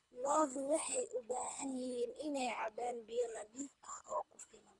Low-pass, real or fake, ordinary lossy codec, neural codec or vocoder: 10.8 kHz; fake; none; codec, 24 kHz, 3 kbps, HILCodec